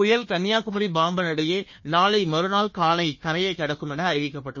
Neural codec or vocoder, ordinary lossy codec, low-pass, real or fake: codec, 16 kHz, 1 kbps, FunCodec, trained on Chinese and English, 50 frames a second; MP3, 32 kbps; 7.2 kHz; fake